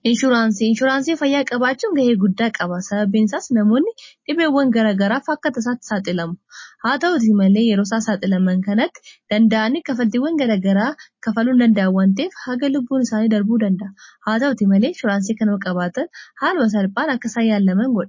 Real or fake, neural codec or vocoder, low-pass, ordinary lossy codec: real; none; 7.2 kHz; MP3, 32 kbps